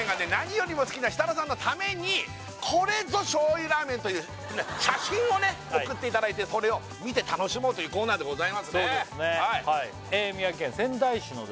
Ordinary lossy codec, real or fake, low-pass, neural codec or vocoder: none; real; none; none